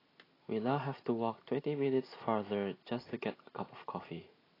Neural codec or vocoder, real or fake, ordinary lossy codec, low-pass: none; real; AAC, 24 kbps; 5.4 kHz